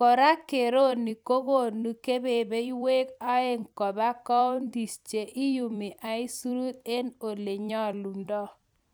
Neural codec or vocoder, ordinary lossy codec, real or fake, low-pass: vocoder, 44.1 kHz, 128 mel bands every 256 samples, BigVGAN v2; none; fake; none